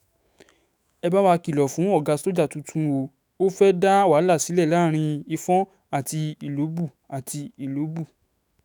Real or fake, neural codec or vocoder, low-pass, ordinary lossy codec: fake; autoencoder, 48 kHz, 128 numbers a frame, DAC-VAE, trained on Japanese speech; none; none